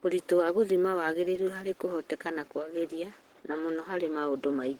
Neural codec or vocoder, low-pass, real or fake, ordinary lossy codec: codec, 44.1 kHz, 7.8 kbps, Pupu-Codec; 19.8 kHz; fake; Opus, 16 kbps